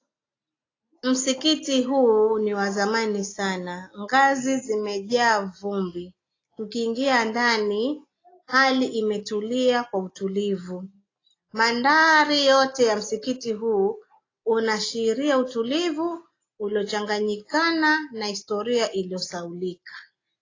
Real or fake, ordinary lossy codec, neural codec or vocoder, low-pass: real; AAC, 32 kbps; none; 7.2 kHz